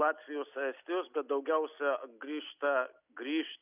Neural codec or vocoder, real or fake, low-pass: none; real; 3.6 kHz